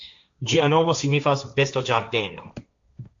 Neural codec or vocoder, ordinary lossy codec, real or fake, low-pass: codec, 16 kHz, 1.1 kbps, Voila-Tokenizer; AAC, 64 kbps; fake; 7.2 kHz